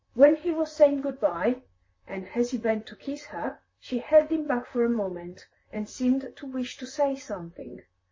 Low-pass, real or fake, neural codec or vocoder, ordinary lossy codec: 7.2 kHz; fake; vocoder, 44.1 kHz, 128 mel bands, Pupu-Vocoder; MP3, 32 kbps